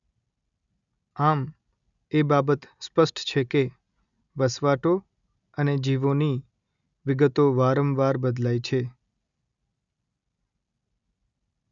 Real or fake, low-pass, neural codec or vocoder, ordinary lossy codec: real; 7.2 kHz; none; none